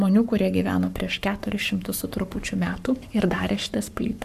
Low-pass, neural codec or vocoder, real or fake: 14.4 kHz; codec, 44.1 kHz, 7.8 kbps, Pupu-Codec; fake